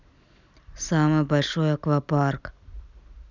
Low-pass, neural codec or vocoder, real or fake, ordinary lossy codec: 7.2 kHz; none; real; none